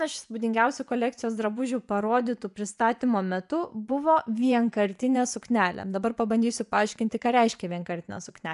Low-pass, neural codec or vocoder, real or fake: 10.8 kHz; vocoder, 24 kHz, 100 mel bands, Vocos; fake